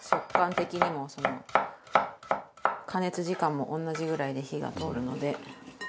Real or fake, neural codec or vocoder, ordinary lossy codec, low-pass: real; none; none; none